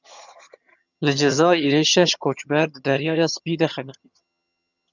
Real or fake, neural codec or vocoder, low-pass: fake; vocoder, 22.05 kHz, 80 mel bands, HiFi-GAN; 7.2 kHz